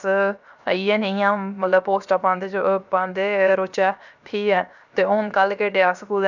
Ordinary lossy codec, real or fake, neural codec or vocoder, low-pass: none; fake; codec, 16 kHz, 0.7 kbps, FocalCodec; 7.2 kHz